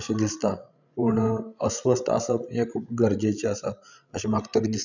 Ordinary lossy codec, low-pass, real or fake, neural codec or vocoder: none; 7.2 kHz; fake; codec, 16 kHz, 16 kbps, FreqCodec, larger model